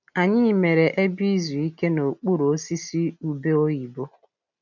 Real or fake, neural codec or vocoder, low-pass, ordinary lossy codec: real; none; 7.2 kHz; none